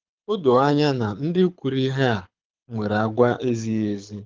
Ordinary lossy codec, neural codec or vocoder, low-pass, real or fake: Opus, 32 kbps; codec, 24 kHz, 6 kbps, HILCodec; 7.2 kHz; fake